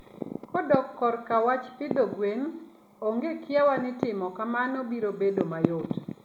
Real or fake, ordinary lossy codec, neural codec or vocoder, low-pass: real; none; none; 19.8 kHz